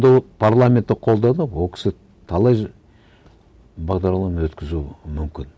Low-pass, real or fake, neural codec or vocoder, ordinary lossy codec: none; real; none; none